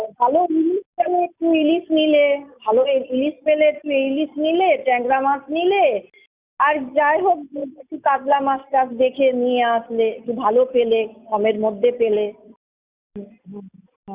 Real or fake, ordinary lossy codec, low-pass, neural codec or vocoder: real; Opus, 64 kbps; 3.6 kHz; none